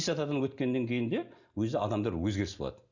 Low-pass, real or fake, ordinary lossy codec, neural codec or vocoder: 7.2 kHz; real; none; none